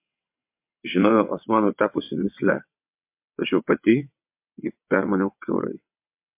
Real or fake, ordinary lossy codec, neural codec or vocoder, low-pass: fake; MP3, 32 kbps; vocoder, 22.05 kHz, 80 mel bands, WaveNeXt; 3.6 kHz